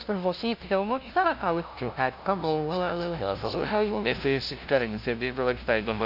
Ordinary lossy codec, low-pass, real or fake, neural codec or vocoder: none; 5.4 kHz; fake; codec, 16 kHz, 0.5 kbps, FunCodec, trained on LibriTTS, 25 frames a second